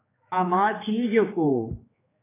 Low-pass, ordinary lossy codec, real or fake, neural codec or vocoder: 3.6 kHz; AAC, 16 kbps; fake; codec, 16 kHz, 4 kbps, X-Codec, HuBERT features, trained on general audio